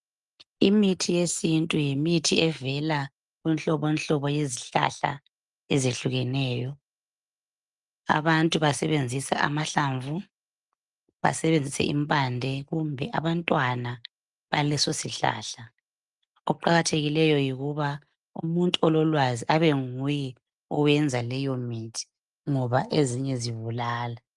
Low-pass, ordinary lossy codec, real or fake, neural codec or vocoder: 10.8 kHz; Opus, 32 kbps; real; none